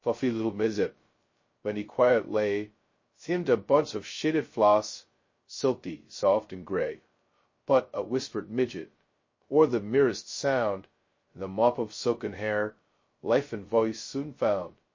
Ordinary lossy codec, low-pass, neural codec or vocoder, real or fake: MP3, 32 kbps; 7.2 kHz; codec, 16 kHz, 0.2 kbps, FocalCodec; fake